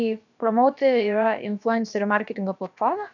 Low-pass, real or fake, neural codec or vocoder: 7.2 kHz; fake; codec, 16 kHz, about 1 kbps, DyCAST, with the encoder's durations